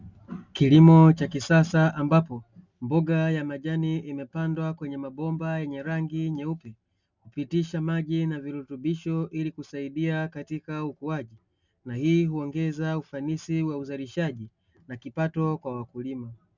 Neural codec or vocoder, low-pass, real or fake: none; 7.2 kHz; real